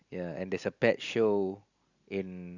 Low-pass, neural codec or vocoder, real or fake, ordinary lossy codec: 7.2 kHz; none; real; Opus, 64 kbps